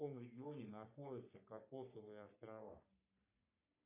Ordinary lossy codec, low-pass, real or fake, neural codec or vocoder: AAC, 32 kbps; 3.6 kHz; fake; codec, 44.1 kHz, 3.4 kbps, Pupu-Codec